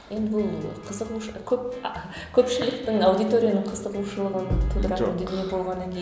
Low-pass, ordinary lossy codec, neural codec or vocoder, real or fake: none; none; none; real